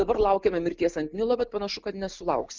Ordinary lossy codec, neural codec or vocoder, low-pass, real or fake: Opus, 24 kbps; none; 7.2 kHz; real